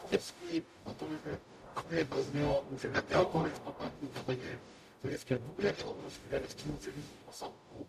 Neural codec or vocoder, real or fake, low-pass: codec, 44.1 kHz, 0.9 kbps, DAC; fake; 14.4 kHz